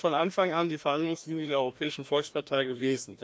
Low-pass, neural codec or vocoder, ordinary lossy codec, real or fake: none; codec, 16 kHz, 1 kbps, FreqCodec, larger model; none; fake